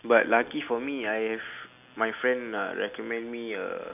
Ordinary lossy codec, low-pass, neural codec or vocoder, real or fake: none; 3.6 kHz; none; real